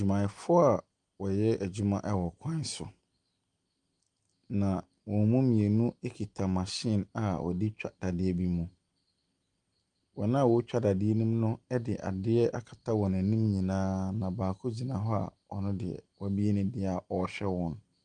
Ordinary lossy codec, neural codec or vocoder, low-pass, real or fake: Opus, 32 kbps; none; 10.8 kHz; real